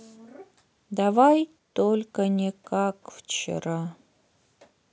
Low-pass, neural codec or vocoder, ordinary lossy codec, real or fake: none; none; none; real